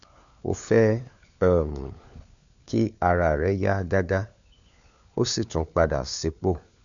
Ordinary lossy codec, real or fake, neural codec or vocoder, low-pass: none; fake; codec, 16 kHz, 4 kbps, FunCodec, trained on LibriTTS, 50 frames a second; 7.2 kHz